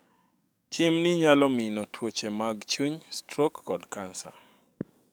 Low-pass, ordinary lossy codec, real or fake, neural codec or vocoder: none; none; fake; codec, 44.1 kHz, 7.8 kbps, DAC